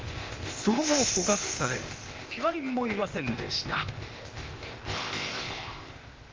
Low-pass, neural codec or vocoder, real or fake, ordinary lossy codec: 7.2 kHz; codec, 16 kHz, 0.8 kbps, ZipCodec; fake; Opus, 32 kbps